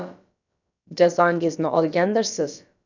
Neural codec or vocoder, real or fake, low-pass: codec, 16 kHz, about 1 kbps, DyCAST, with the encoder's durations; fake; 7.2 kHz